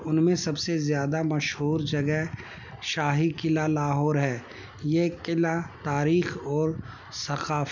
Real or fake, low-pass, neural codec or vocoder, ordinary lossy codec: real; 7.2 kHz; none; none